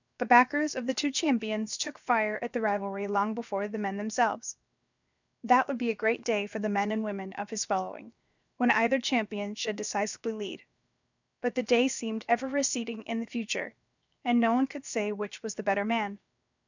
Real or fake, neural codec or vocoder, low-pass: fake; codec, 16 kHz, 0.7 kbps, FocalCodec; 7.2 kHz